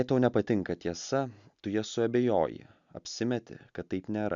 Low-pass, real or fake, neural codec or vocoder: 7.2 kHz; real; none